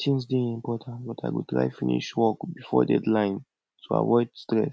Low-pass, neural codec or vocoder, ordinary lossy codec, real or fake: none; none; none; real